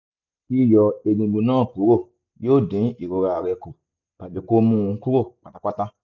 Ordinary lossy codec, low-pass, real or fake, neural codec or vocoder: none; 7.2 kHz; real; none